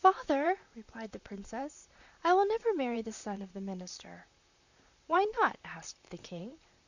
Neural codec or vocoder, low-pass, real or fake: vocoder, 44.1 kHz, 128 mel bands, Pupu-Vocoder; 7.2 kHz; fake